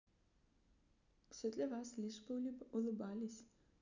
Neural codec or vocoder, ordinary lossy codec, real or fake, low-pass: none; none; real; 7.2 kHz